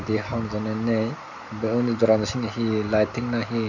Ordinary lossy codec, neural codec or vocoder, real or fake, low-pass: none; none; real; 7.2 kHz